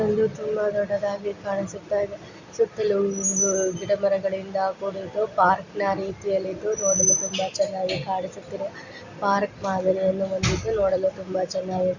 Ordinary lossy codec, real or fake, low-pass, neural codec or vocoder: Opus, 64 kbps; real; 7.2 kHz; none